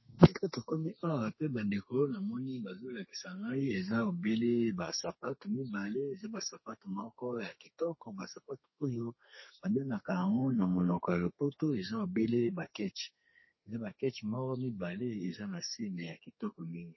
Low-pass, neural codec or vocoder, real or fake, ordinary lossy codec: 7.2 kHz; codec, 32 kHz, 1.9 kbps, SNAC; fake; MP3, 24 kbps